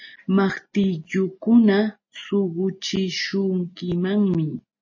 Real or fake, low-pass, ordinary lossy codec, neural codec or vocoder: real; 7.2 kHz; MP3, 32 kbps; none